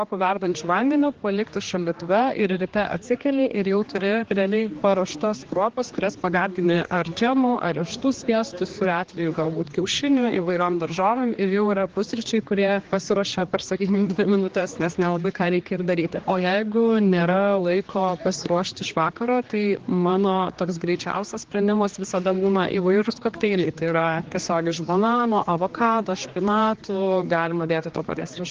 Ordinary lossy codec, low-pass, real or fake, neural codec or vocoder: Opus, 16 kbps; 7.2 kHz; fake; codec, 16 kHz, 2 kbps, X-Codec, HuBERT features, trained on general audio